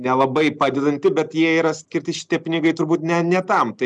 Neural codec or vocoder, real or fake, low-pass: none; real; 10.8 kHz